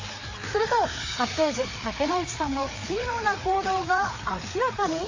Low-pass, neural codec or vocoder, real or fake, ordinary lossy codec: 7.2 kHz; codec, 16 kHz, 4 kbps, FreqCodec, larger model; fake; MP3, 32 kbps